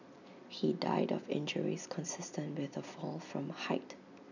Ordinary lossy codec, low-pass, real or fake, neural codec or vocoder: none; 7.2 kHz; real; none